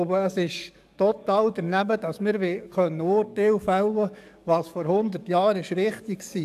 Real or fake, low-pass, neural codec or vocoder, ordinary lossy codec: fake; 14.4 kHz; codec, 44.1 kHz, 7.8 kbps, DAC; none